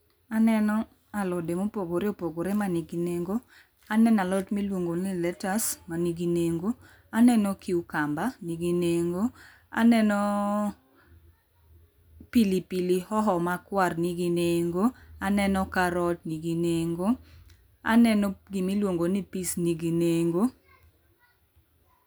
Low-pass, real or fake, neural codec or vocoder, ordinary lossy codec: none; real; none; none